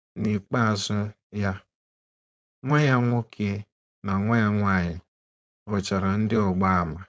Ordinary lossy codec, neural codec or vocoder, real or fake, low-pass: none; codec, 16 kHz, 4.8 kbps, FACodec; fake; none